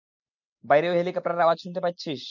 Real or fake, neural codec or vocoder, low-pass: real; none; 7.2 kHz